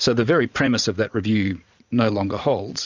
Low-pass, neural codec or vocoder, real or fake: 7.2 kHz; vocoder, 44.1 kHz, 80 mel bands, Vocos; fake